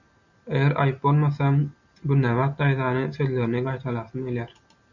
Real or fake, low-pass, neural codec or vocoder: real; 7.2 kHz; none